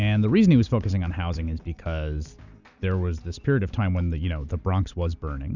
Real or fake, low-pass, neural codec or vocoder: real; 7.2 kHz; none